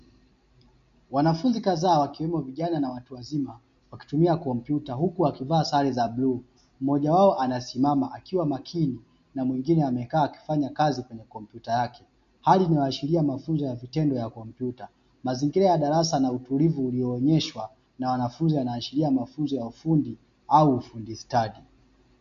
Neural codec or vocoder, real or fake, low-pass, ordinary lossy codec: none; real; 7.2 kHz; MP3, 48 kbps